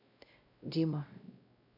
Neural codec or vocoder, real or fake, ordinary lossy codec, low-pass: codec, 16 kHz, 0.5 kbps, X-Codec, WavLM features, trained on Multilingual LibriSpeech; fake; AAC, 32 kbps; 5.4 kHz